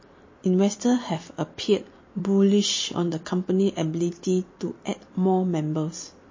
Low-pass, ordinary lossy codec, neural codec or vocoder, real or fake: 7.2 kHz; MP3, 32 kbps; vocoder, 44.1 kHz, 128 mel bands every 256 samples, BigVGAN v2; fake